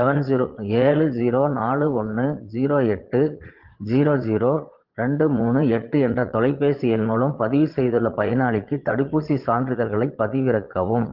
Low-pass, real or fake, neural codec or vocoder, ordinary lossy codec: 5.4 kHz; fake; vocoder, 22.05 kHz, 80 mel bands, Vocos; Opus, 16 kbps